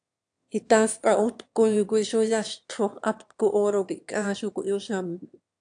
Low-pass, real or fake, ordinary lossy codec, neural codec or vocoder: 9.9 kHz; fake; AAC, 64 kbps; autoencoder, 22.05 kHz, a latent of 192 numbers a frame, VITS, trained on one speaker